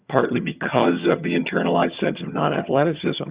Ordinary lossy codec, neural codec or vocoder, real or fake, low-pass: Opus, 64 kbps; vocoder, 22.05 kHz, 80 mel bands, HiFi-GAN; fake; 3.6 kHz